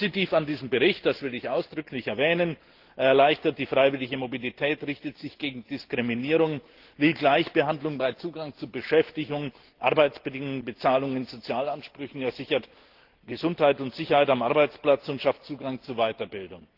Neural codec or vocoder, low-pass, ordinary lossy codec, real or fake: none; 5.4 kHz; Opus, 16 kbps; real